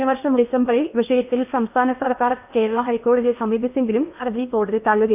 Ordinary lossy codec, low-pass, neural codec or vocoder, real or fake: none; 3.6 kHz; codec, 16 kHz in and 24 kHz out, 0.8 kbps, FocalCodec, streaming, 65536 codes; fake